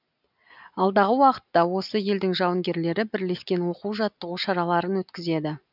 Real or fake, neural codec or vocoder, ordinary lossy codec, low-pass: real; none; none; 5.4 kHz